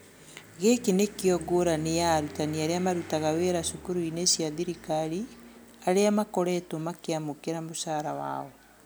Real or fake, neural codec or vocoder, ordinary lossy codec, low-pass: real; none; none; none